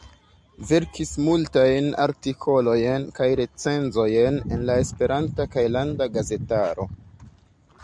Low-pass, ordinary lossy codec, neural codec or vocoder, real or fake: 9.9 kHz; MP3, 96 kbps; none; real